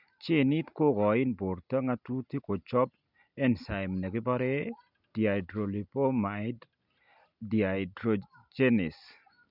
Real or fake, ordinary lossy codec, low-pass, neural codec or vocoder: real; none; 5.4 kHz; none